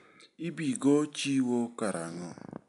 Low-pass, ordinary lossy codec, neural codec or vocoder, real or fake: 10.8 kHz; none; none; real